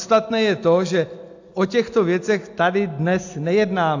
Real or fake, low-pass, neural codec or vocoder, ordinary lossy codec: real; 7.2 kHz; none; AAC, 48 kbps